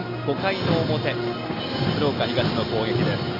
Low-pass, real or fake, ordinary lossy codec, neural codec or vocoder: 5.4 kHz; real; Opus, 64 kbps; none